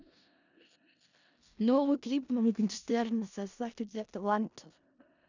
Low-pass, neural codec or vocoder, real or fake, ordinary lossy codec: 7.2 kHz; codec, 16 kHz in and 24 kHz out, 0.4 kbps, LongCat-Audio-Codec, four codebook decoder; fake; none